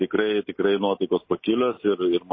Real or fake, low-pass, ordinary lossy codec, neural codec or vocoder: real; 7.2 kHz; MP3, 24 kbps; none